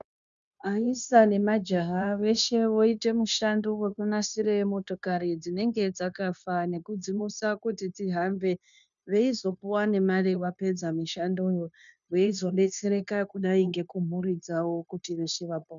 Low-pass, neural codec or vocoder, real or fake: 7.2 kHz; codec, 16 kHz, 0.9 kbps, LongCat-Audio-Codec; fake